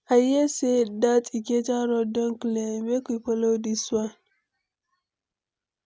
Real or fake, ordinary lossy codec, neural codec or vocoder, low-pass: real; none; none; none